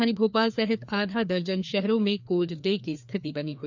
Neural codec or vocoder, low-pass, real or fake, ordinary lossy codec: codec, 16 kHz, 2 kbps, FreqCodec, larger model; 7.2 kHz; fake; none